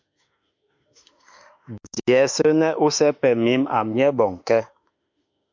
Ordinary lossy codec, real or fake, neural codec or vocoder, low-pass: MP3, 64 kbps; fake; autoencoder, 48 kHz, 32 numbers a frame, DAC-VAE, trained on Japanese speech; 7.2 kHz